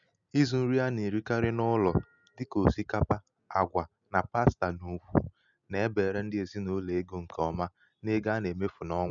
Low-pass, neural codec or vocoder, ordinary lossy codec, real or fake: 7.2 kHz; none; none; real